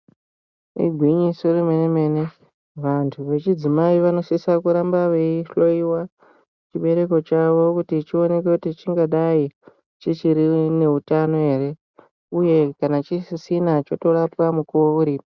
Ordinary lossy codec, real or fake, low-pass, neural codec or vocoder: Opus, 64 kbps; real; 7.2 kHz; none